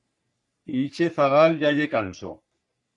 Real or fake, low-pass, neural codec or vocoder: fake; 10.8 kHz; codec, 44.1 kHz, 3.4 kbps, Pupu-Codec